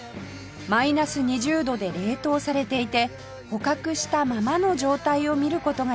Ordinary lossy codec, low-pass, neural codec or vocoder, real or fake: none; none; none; real